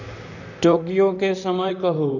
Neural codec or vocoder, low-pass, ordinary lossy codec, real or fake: vocoder, 44.1 kHz, 128 mel bands, Pupu-Vocoder; 7.2 kHz; none; fake